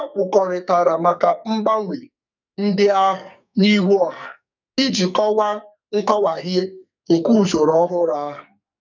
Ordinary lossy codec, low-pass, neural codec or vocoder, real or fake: none; 7.2 kHz; codec, 44.1 kHz, 2.6 kbps, SNAC; fake